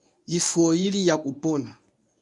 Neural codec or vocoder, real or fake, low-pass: codec, 24 kHz, 0.9 kbps, WavTokenizer, medium speech release version 1; fake; 10.8 kHz